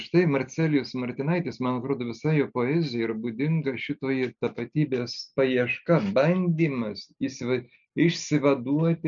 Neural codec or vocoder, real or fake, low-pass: none; real; 7.2 kHz